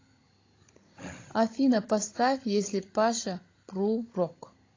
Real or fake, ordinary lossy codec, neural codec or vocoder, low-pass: fake; AAC, 32 kbps; codec, 16 kHz, 16 kbps, FunCodec, trained on Chinese and English, 50 frames a second; 7.2 kHz